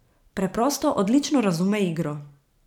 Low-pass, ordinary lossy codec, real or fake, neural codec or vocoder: 19.8 kHz; none; fake; vocoder, 44.1 kHz, 128 mel bands every 512 samples, BigVGAN v2